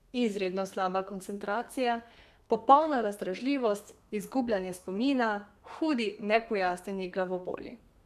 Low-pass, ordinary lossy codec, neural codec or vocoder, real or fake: 14.4 kHz; none; codec, 32 kHz, 1.9 kbps, SNAC; fake